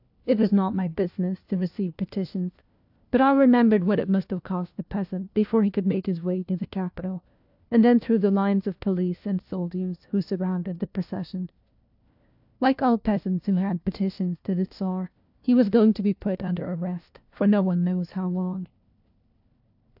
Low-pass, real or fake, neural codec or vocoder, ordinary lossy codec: 5.4 kHz; fake; codec, 16 kHz, 1 kbps, FunCodec, trained on LibriTTS, 50 frames a second; AAC, 48 kbps